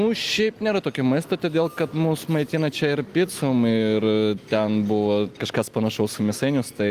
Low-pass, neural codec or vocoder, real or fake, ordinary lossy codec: 14.4 kHz; none; real; Opus, 32 kbps